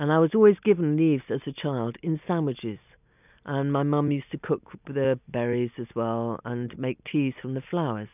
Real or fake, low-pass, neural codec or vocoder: real; 3.6 kHz; none